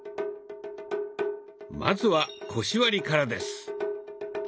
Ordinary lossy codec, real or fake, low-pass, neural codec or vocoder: none; real; none; none